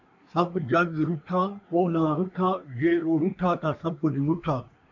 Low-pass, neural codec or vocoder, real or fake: 7.2 kHz; codec, 24 kHz, 1 kbps, SNAC; fake